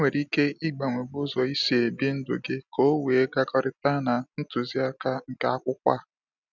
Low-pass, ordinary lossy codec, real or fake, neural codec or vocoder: 7.2 kHz; none; real; none